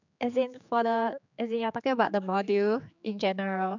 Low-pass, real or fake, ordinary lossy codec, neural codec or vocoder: 7.2 kHz; fake; none; codec, 16 kHz, 4 kbps, X-Codec, HuBERT features, trained on general audio